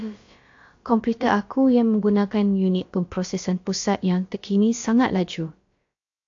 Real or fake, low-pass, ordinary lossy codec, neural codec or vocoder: fake; 7.2 kHz; AAC, 48 kbps; codec, 16 kHz, about 1 kbps, DyCAST, with the encoder's durations